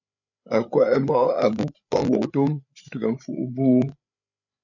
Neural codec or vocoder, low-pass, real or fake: codec, 16 kHz, 8 kbps, FreqCodec, larger model; 7.2 kHz; fake